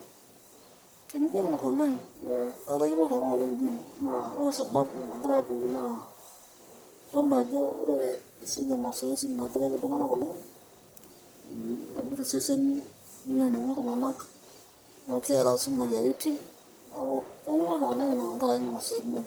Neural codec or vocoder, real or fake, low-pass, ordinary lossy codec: codec, 44.1 kHz, 1.7 kbps, Pupu-Codec; fake; none; none